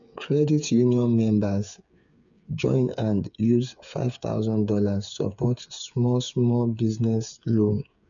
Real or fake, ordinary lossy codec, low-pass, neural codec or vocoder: fake; none; 7.2 kHz; codec, 16 kHz, 8 kbps, FreqCodec, smaller model